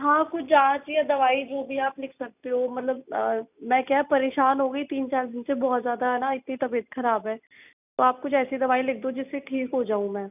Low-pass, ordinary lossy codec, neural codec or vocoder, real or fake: 3.6 kHz; none; none; real